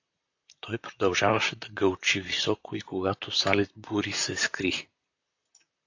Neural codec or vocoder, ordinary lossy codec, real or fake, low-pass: vocoder, 44.1 kHz, 128 mel bands, Pupu-Vocoder; AAC, 48 kbps; fake; 7.2 kHz